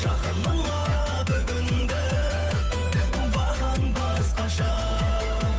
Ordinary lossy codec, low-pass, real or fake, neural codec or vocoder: none; none; fake; codec, 16 kHz, 8 kbps, FunCodec, trained on Chinese and English, 25 frames a second